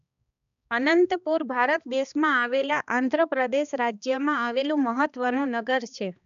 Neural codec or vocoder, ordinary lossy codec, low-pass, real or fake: codec, 16 kHz, 2 kbps, X-Codec, HuBERT features, trained on balanced general audio; none; 7.2 kHz; fake